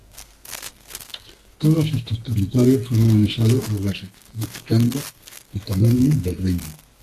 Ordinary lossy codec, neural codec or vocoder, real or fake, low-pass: AAC, 64 kbps; codec, 32 kHz, 1.9 kbps, SNAC; fake; 14.4 kHz